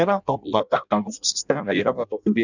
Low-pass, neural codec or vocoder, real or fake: 7.2 kHz; codec, 16 kHz in and 24 kHz out, 0.6 kbps, FireRedTTS-2 codec; fake